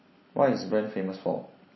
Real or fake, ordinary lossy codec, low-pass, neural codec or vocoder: real; MP3, 24 kbps; 7.2 kHz; none